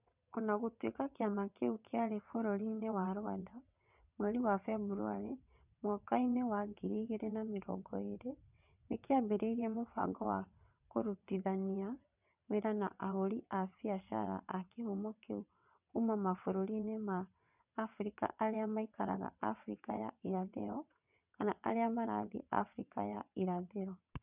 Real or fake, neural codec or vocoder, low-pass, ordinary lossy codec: fake; vocoder, 22.05 kHz, 80 mel bands, WaveNeXt; 3.6 kHz; none